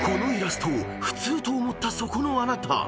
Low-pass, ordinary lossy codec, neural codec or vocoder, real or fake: none; none; none; real